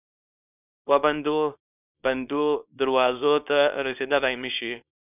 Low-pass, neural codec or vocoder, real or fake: 3.6 kHz; codec, 24 kHz, 0.9 kbps, WavTokenizer, small release; fake